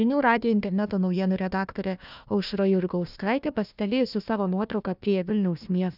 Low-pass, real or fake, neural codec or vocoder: 5.4 kHz; fake; codec, 16 kHz, 1 kbps, FunCodec, trained on Chinese and English, 50 frames a second